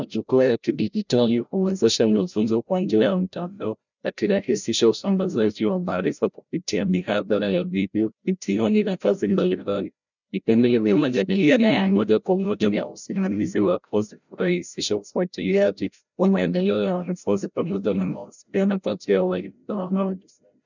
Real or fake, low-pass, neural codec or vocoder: fake; 7.2 kHz; codec, 16 kHz, 0.5 kbps, FreqCodec, larger model